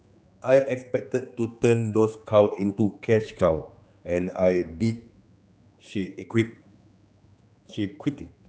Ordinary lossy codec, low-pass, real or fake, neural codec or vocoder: none; none; fake; codec, 16 kHz, 2 kbps, X-Codec, HuBERT features, trained on general audio